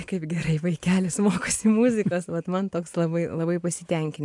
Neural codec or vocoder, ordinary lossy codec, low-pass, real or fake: none; AAC, 64 kbps; 10.8 kHz; real